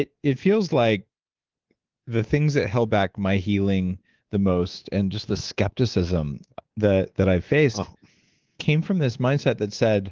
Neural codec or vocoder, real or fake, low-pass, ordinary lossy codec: codec, 24 kHz, 3.1 kbps, DualCodec; fake; 7.2 kHz; Opus, 16 kbps